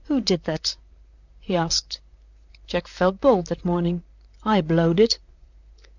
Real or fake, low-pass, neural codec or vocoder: fake; 7.2 kHz; vocoder, 44.1 kHz, 128 mel bands, Pupu-Vocoder